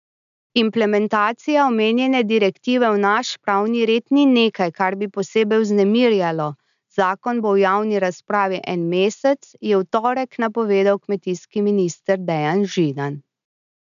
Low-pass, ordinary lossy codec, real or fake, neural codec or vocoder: 7.2 kHz; none; real; none